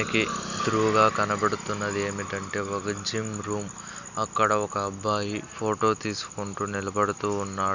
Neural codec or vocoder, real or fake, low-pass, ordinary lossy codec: none; real; 7.2 kHz; none